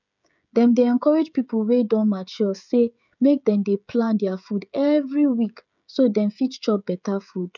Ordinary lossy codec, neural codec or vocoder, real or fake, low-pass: none; codec, 16 kHz, 16 kbps, FreqCodec, smaller model; fake; 7.2 kHz